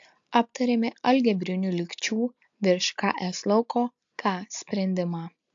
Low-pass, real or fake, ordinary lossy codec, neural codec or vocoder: 7.2 kHz; real; AAC, 64 kbps; none